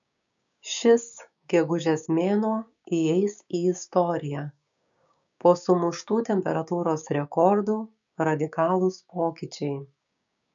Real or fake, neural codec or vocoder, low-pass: fake; codec, 16 kHz, 6 kbps, DAC; 7.2 kHz